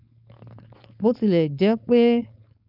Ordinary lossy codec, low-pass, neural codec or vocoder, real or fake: none; 5.4 kHz; codec, 16 kHz, 4.8 kbps, FACodec; fake